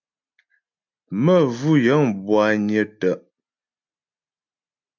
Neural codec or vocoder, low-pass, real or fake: none; 7.2 kHz; real